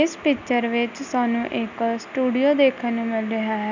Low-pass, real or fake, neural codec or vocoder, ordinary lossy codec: 7.2 kHz; real; none; none